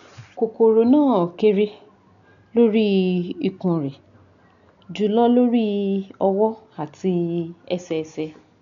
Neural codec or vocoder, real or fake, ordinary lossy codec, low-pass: none; real; none; 7.2 kHz